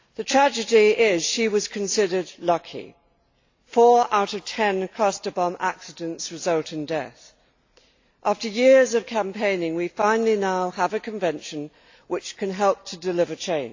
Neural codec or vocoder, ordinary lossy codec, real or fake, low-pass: none; AAC, 48 kbps; real; 7.2 kHz